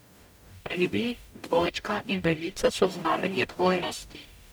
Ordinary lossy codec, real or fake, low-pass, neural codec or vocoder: none; fake; none; codec, 44.1 kHz, 0.9 kbps, DAC